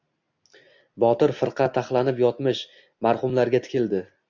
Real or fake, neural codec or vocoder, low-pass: real; none; 7.2 kHz